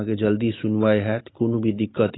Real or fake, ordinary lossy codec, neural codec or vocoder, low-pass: real; AAC, 16 kbps; none; 7.2 kHz